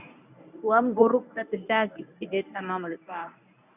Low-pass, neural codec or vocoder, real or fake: 3.6 kHz; codec, 24 kHz, 0.9 kbps, WavTokenizer, medium speech release version 1; fake